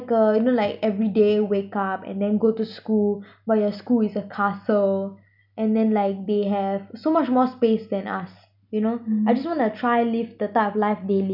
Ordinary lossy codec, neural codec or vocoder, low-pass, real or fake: none; none; 5.4 kHz; real